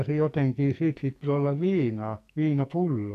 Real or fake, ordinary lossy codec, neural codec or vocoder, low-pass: fake; none; codec, 44.1 kHz, 2.6 kbps, SNAC; 14.4 kHz